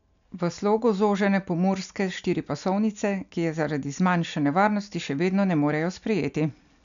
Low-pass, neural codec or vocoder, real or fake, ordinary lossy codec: 7.2 kHz; none; real; MP3, 96 kbps